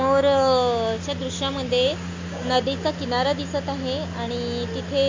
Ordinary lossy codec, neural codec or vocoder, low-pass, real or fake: MP3, 48 kbps; none; 7.2 kHz; real